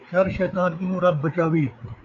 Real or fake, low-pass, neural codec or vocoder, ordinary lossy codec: fake; 7.2 kHz; codec, 16 kHz, 4 kbps, FreqCodec, larger model; MP3, 64 kbps